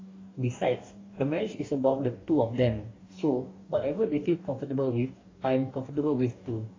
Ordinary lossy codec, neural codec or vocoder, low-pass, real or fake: AAC, 32 kbps; codec, 44.1 kHz, 2.6 kbps, DAC; 7.2 kHz; fake